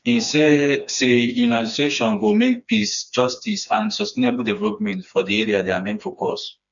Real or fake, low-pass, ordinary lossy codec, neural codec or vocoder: fake; 7.2 kHz; none; codec, 16 kHz, 2 kbps, FreqCodec, smaller model